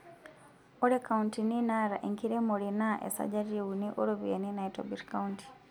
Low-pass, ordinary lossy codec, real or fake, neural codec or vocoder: 19.8 kHz; none; real; none